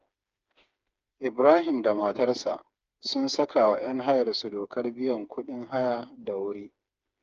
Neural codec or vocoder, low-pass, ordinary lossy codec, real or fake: codec, 16 kHz, 4 kbps, FreqCodec, smaller model; 7.2 kHz; Opus, 24 kbps; fake